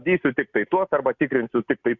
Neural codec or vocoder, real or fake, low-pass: none; real; 7.2 kHz